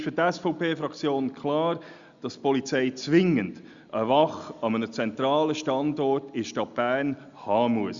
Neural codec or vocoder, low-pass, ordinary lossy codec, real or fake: none; 7.2 kHz; Opus, 64 kbps; real